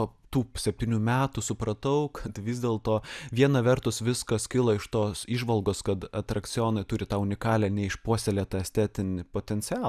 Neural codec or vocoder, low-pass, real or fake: none; 14.4 kHz; real